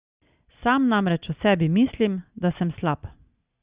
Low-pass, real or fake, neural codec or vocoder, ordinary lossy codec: 3.6 kHz; real; none; Opus, 64 kbps